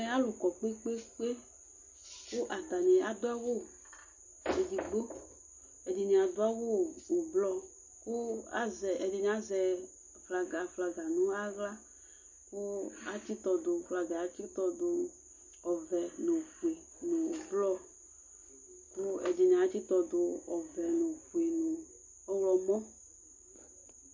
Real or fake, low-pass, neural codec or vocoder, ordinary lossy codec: real; 7.2 kHz; none; MP3, 32 kbps